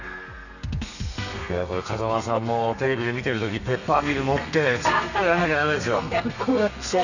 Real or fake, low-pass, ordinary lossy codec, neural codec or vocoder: fake; 7.2 kHz; none; codec, 32 kHz, 1.9 kbps, SNAC